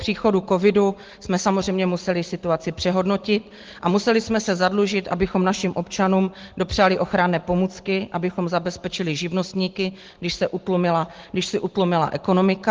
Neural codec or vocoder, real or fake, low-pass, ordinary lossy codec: none; real; 7.2 kHz; Opus, 16 kbps